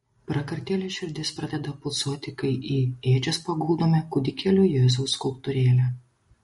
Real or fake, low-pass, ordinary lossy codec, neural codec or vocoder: real; 19.8 kHz; MP3, 48 kbps; none